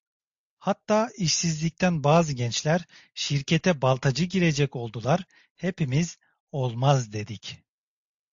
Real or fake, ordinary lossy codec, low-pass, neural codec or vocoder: real; AAC, 64 kbps; 7.2 kHz; none